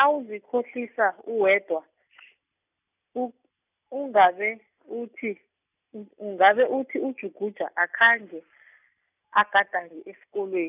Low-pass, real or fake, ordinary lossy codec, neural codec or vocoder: 3.6 kHz; real; none; none